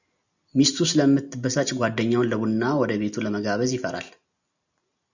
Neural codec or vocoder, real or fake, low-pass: none; real; 7.2 kHz